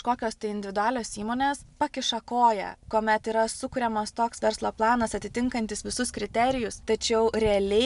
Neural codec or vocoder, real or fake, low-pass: none; real; 10.8 kHz